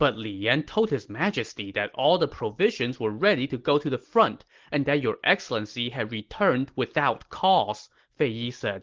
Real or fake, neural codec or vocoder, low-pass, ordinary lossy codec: real; none; 7.2 kHz; Opus, 16 kbps